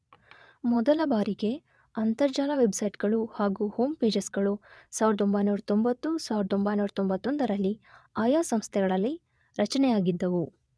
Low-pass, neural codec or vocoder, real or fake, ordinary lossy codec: none; vocoder, 22.05 kHz, 80 mel bands, Vocos; fake; none